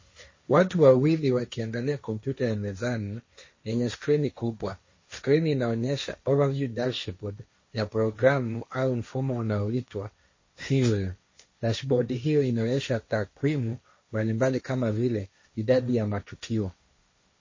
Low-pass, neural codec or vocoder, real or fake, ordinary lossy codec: 7.2 kHz; codec, 16 kHz, 1.1 kbps, Voila-Tokenizer; fake; MP3, 32 kbps